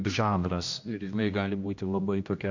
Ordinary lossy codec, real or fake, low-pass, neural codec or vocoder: MP3, 48 kbps; fake; 7.2 kHz; codec, 16 kHz, 1 kbps, X-Codec, HuBERT features, trained on general audio